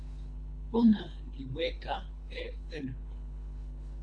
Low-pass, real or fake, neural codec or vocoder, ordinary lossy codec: 9.9 kHz; fake; codec, 24 kHz, 3 kbps, HILCodec; AAC, 64 kbps